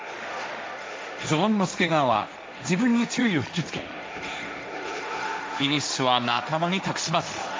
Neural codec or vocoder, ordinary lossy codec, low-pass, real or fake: codec, 16 kHz, 1.1 kbps, Voila-Tokenizer; none; none; fake